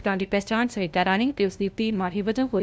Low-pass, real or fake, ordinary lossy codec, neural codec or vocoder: none; fake; none; codec, 16 kHz, 0.5 kbps, FunCodec, trained on LibriTTS, 25 frames a second